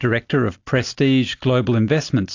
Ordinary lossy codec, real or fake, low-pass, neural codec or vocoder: AAC, 48 kbps; real; 7.2 kHz; none